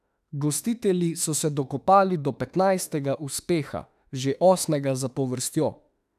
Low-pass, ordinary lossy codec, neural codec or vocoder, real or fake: 14.4 kHz; none; autoencoder, 48 kHz, 32 numbers a frame, DAC-VAE, trained on Japanese speech; fake